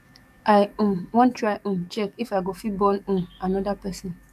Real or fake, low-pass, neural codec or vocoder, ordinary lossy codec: fake; 14.4 kHz; codec, 44.1 kHz, 7.8 kbps, DAC; AAC, 96 kbps